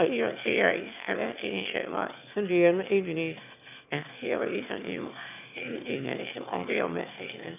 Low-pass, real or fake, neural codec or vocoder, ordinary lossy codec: 3.6 kHz; fake; autoencoder, 22.05 kHz, a latent of 192 numbers a frame, VITS, trained on one speaker; none